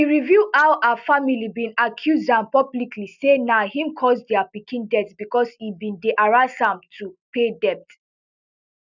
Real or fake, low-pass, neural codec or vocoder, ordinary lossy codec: real; 7.2 kHz; none; none